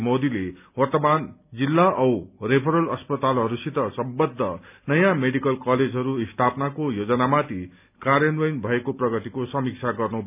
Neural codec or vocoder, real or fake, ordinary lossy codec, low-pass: none; real; none; 3.6 kHz